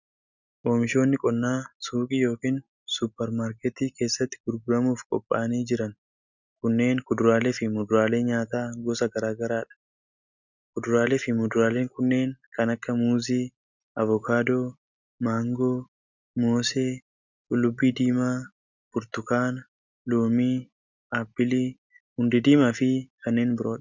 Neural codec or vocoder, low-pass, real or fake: none; 7.2 kHz; real